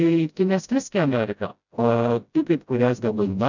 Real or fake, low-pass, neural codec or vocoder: fake; 7.2 kHz; codec, 16 kHz, 0.5 kbps, FreqCodec, smaller model